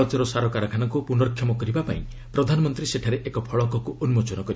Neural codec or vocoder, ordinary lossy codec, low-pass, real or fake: none; none; none; real